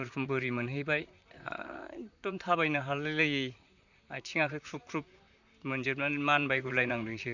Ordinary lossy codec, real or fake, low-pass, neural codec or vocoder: none; fake; 7.2 kHz; vocoder, 44.1 kHz, 128 mel bands, Pupu-Vocoder